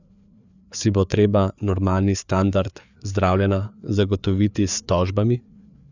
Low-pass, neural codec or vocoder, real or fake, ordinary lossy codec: 7.2 kHz; codec, 16 kHz, 4 kbps, FreqCodec, larger model; fake; none